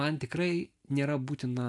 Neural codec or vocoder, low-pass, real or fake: none; 10.8 kHz; real